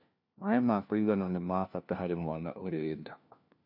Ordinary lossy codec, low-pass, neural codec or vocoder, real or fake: none; 5.4 kHz; codec, 16 kHz, 1 kbps, FunCodec, trained on LibriTTS, 50 frames a second; fake